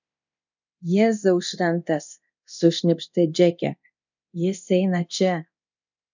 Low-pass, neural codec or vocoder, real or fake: 7.2 kHz; codec, 24 kHz, 0.9 kbps, DualCodec; fake